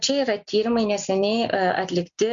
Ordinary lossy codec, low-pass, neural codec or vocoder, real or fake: MP3, 48 kbps; 7.2 kHz; none; real